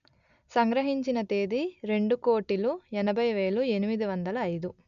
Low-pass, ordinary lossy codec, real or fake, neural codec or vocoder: 7.2 kHz; none; real; none